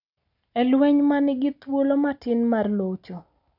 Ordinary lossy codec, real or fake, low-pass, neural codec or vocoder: none; real; 5.4 kHz; none